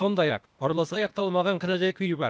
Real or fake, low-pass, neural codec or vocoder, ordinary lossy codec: fake; none; codec, 16 kHz, 0.8 kbps, ZipCodec; none